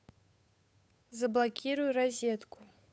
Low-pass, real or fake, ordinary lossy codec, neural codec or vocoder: none; fake; none; codec, 16 kHz, 8 kbps, FunCodec, trained on Chinese and English, 25 frames a second